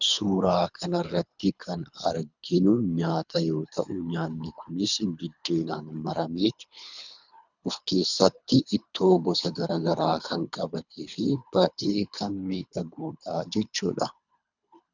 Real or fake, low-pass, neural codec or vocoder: fake; 7.2 kHz; codec, 24 kHz, 3 kbps, HILCodec